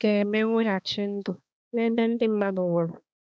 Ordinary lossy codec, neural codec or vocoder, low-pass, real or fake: none; codec, 16 kHz, 2 kbps, X-Codec, HuBERT features, trained on balanced general audio; none; fake